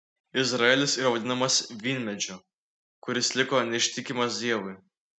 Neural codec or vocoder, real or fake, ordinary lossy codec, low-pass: none; real; MP3, 96 kbps; 9.9 kHz